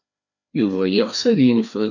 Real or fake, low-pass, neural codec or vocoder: fake; 7.2 kHz; codec, 16 kHz, 2 kbps, FreqCodec, larger model